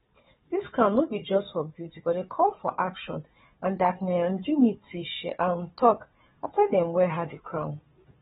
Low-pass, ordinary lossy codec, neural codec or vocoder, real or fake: 7.2 kHz; AAC, 16 kbps; codec, 16 kHz, 4 kbps, FunCodec, trained on Chinese and English, 50 frames a second; fake